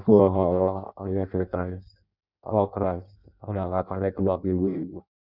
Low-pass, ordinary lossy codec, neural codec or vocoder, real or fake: 5.4 kHz; Opus, 64 kbps; codec, 16 kHz in and 24 kHz out, 0.6 kbps, FireRedTTS-2 codec; fake